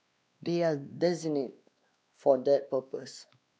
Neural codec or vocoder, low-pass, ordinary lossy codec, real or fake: codec, 16 kHz, 2 kbps, X-Codec, WavLM features, trained on Multilingual LibriSpeech; none; none; fake